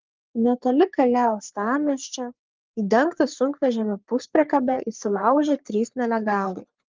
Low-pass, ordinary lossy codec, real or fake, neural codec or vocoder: 7.2 kHz; Opus, 32 kbps; fake; codec, 44.1 kHz, 3.4 kbps, Pupu-Codec